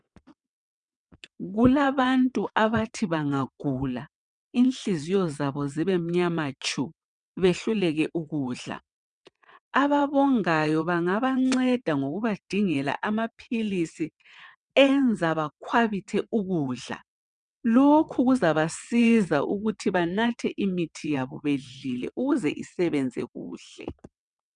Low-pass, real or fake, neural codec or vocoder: 9.9 kHz; fake; vocoder, 22.05 kHz, 80 mel bands, WaveNeXt